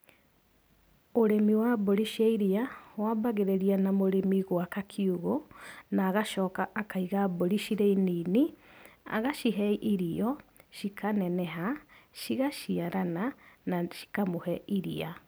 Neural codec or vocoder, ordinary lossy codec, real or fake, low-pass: none; none; real; none